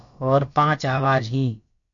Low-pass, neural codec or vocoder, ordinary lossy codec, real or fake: 7.2 kHz; codec, 16 kHz, about 1 kbps, DyCAST, with the encoder's durations; MP3, 48 kbps; fake